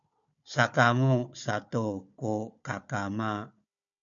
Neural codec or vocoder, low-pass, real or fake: codec, 16 kHz, 4 kbps, FunCodec, trained on Chinese and English, 50 frames a second; 7.2 kHz; fake